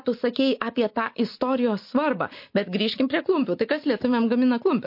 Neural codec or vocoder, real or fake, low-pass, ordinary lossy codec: none; real; 5.4 kHz; MP3, 32 kbps